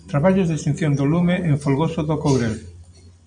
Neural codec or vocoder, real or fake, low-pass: none; real; 9.9 kHz